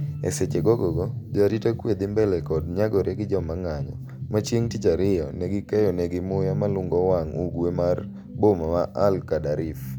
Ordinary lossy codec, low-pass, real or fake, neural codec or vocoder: none; 19.8 kHz; real; none